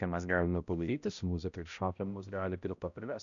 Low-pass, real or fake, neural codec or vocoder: 7.2 kHz; fake; codec, 16 kHz, 0.5 kbps, X-Codec, HuBERT features, trained on balanced general audio